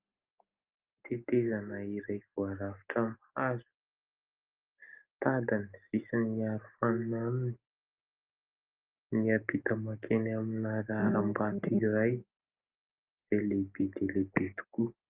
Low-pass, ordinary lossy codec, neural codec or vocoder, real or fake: 3.6 kHz; Opus, 32 kbps; none; real